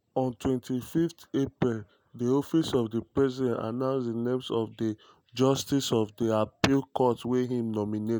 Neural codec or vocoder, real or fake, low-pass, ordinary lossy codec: none; real; none; none